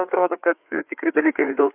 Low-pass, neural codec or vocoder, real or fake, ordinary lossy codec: 3.6 kHz; codec, 16 kHz, 4 kbps, FreqCodec, larger model; fake; Opus, 64 kbps